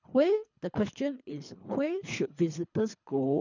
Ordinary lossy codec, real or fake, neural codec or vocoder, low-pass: none; fake; codec, 24 kHz, 3 kbps, HILCodec; 7.2 kHz